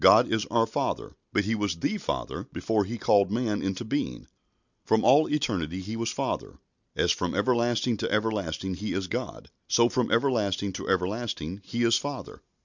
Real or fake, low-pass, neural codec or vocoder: real; 7.2 kHz; none